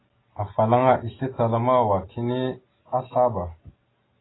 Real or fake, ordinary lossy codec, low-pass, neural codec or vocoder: real; AAC, 16 kbps; 7.2 kHz; none